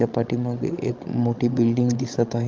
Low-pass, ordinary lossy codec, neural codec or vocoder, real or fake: 7.2 kHz; Opus, 32 kbps; codec, 16 kHz, 16 kbps, FreqCodec, larger model; fake